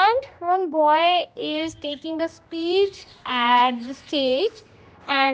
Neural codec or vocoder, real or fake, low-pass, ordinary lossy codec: codec, 16 kHz, 1 kbps, X-Codec, HuBERT features, trained on general audio; fake; none; none